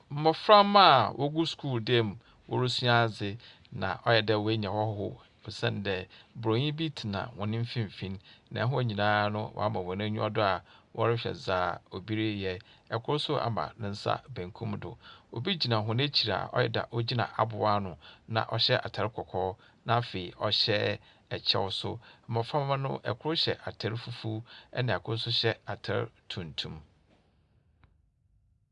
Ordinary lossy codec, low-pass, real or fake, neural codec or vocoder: Opus, 64 kbps; 10.8 kHz; real; none